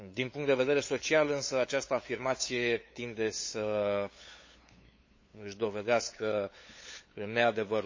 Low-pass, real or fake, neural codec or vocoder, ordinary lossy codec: 7.2 kHz; fake; codec, 16 kHz, 8 kbps, FunCodec, trained on LibriTTS, 25 frames a second; MP3, 32 kbps